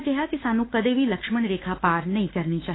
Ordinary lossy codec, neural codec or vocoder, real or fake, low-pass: AAC, 16 kbps; autoencoder, 48 kHz, 32 numbers a frame, DAC-VAE, trained on Japanese speech; fake; 7.2 kHz